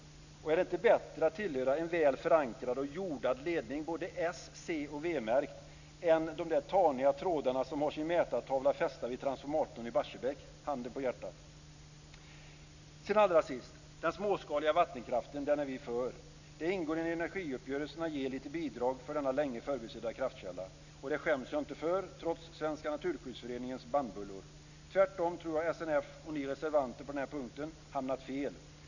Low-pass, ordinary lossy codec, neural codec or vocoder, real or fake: 7.2 kHz; none; none; real